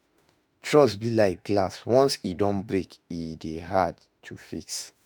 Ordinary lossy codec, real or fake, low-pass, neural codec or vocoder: none; fake; none; autoencoder, 48 kHz, 32 numbers a frame, DAC-VAE, trained on Japanese speech